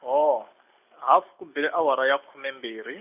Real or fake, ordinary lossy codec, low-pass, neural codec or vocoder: real; none; 3.6 kHz; none